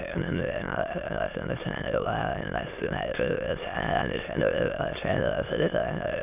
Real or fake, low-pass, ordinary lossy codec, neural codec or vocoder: fake; 3.6 kHz; none; autoencoder, 22.05 kHz, a latent of 192 numbers a frame, VITS, trained on many speakers